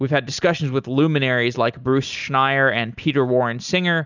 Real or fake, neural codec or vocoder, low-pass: real; none; 7.2 kHz